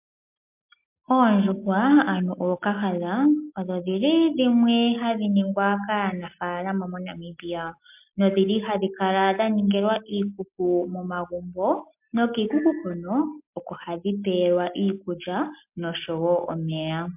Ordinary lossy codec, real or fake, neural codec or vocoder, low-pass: MP3, 32 kbps; real; none; 3.6 kHz